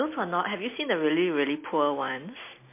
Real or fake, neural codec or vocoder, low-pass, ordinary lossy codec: real; none; 3.6 kHz; MP3, 24 kbps